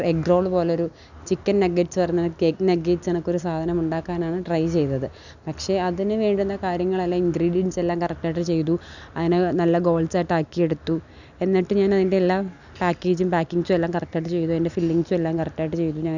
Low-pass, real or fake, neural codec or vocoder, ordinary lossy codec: 7.2 kHz; real; none; none